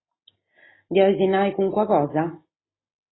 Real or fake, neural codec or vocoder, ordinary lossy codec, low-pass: real; none; AAC, 16 kbps; 7.2 kHz